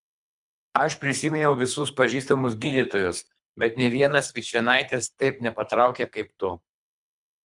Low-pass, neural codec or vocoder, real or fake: 10.8 kHz; codec, 24 kHz, 3 kbps, HILCodec; fake